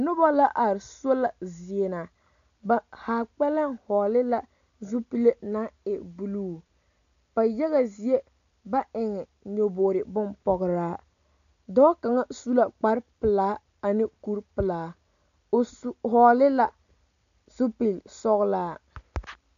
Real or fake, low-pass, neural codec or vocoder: real; 7.2 kHz; none